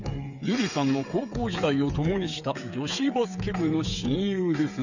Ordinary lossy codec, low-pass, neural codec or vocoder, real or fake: none; 7.2 kHz; codec, 16 kHz, 8 kbps, FreqCodec, smaller model; fake